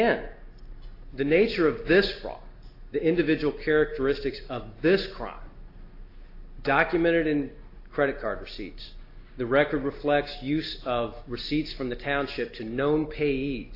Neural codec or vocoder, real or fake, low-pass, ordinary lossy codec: none; real; 5.4 kHz; AAC, 32 kbps